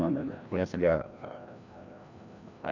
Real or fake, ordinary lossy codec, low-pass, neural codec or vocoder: fake; none; 7.2 kHz; codec, 16 kHz, 1 kbps, FreqCodec, larger model